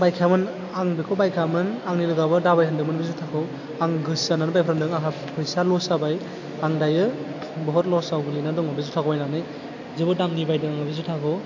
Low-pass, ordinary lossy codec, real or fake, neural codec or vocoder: 7.2 kHz; AAC, 48 kbps; real; none